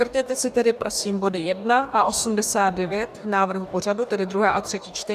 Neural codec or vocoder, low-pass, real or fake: codec, 44.1 kHz, 2.6 kbps, DAC; 14.4 kHz; fake